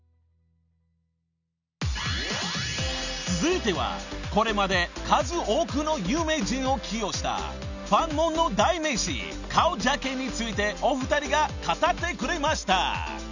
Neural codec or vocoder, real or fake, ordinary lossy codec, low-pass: none; real; none; 7.2 kHz